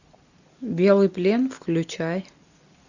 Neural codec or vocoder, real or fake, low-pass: none; real; 7.2 kHz